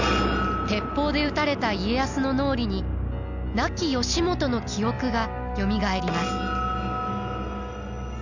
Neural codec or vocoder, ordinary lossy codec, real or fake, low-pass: none; none; real; 7.2 kHz